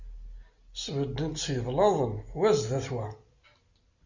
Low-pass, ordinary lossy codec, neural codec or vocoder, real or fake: 7.2 kHz; Opus, 64 kbps; none; real